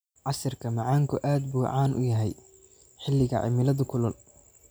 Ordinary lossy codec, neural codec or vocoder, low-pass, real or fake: none; none; none; real